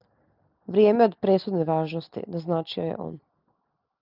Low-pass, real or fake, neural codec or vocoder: 5.4 kHz; real; none